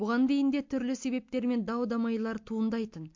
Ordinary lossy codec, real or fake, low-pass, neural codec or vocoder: MP3, 48 kbps; real; 7.2 kHz; none